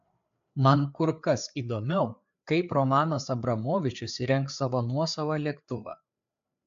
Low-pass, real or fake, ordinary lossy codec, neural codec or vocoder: 7.2 kHz; fake; AAC, 64 kbps; codec, 16 kHz, 4 kbps, FreqCodec, larger model